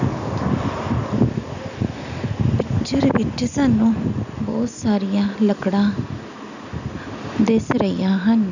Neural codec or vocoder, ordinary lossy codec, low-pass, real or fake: none; none; 7.2 kHz; real